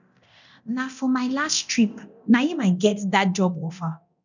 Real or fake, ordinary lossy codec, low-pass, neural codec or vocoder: fake; none; 7.2 kHz; codec, 24 kHz, 0.9 kbps, DualCodec